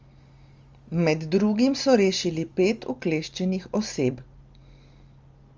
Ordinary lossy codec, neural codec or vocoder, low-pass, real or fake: Opus, 32 kbps; none; 7.2 kHz; real